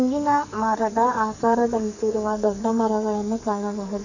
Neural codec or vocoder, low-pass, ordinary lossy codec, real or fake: codec, 44.1 kHz, 2.6 kbps, SNAC; 7.2 kHz; none; fake